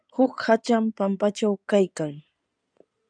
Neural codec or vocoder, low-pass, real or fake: codec, 16 kHz in and 24 kHz out, 2.2 kbps, FireRedTTS-2 codec; 9.9 kHz; fake